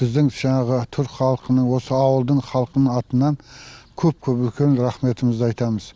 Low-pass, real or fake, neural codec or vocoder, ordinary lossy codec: none; real; none; none